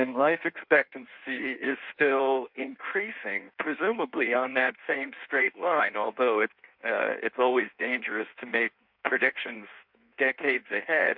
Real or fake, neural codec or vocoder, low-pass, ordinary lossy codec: fake; codec, 16 kHz in and 24 kHz out, 1.1 kbps, FireRedTTS-2 codec; 5.4 kHz; MP3, 48 kbps